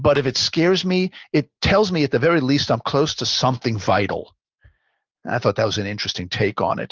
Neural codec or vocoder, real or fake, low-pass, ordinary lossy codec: none; real; 7.2 kHz; Opus, 24 kbps